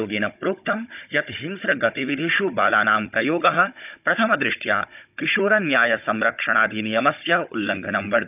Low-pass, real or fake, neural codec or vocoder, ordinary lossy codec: 3.6 kHz; fake; codec, 16 kHz, 16 kbps, FunCodec, trained on Chinese and English, 50 frames a second; none